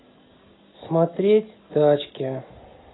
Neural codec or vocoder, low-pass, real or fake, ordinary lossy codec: none; 7.2 kHz; real; AAC, 16 kbps